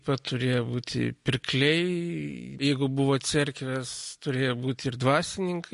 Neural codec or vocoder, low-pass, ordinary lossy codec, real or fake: none; 14.4 kHz; MP3, 48 kbps; real